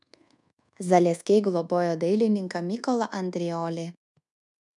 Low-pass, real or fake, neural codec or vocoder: 10.8 kHz; fake; codec, 24 kHz, 1.2 kbps, DualCodec